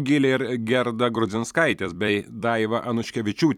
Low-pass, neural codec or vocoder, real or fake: 19.8 kHz; vocoder, 44.1 kHz, 128 mel bands every 256 samples, BigVGAN v2; fake